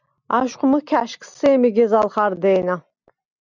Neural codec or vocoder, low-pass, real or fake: none; 7.2 kHz; real